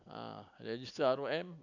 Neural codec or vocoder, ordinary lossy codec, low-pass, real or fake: none; none; 7.2 kHz; real